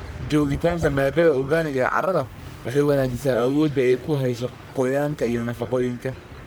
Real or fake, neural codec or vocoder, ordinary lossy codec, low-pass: fake; codec, 44.1 kHz, 1.7 kbps, Pupu-Codec; none; none